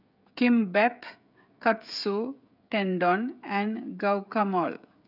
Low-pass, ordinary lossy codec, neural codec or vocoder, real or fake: 5.4 kHz; none; codec, 16 kHz, 16 kbps, FreqCodec, smaller model; fake